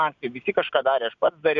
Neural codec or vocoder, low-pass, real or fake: none; 7.2 kHz; real